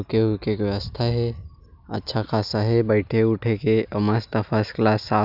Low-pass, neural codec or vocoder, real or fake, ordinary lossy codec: 5.4 kHz; none; real; none